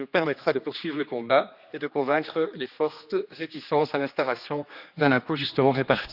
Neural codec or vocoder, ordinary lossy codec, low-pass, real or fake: codec, 16 kHz, 2 kbps, X-Codec, HuBERT features, trained on general audio; Opus, 64 kbps; 5.4 kHz; fake